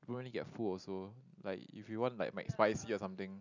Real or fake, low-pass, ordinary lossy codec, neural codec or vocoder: real; 7.2 kHz; none; none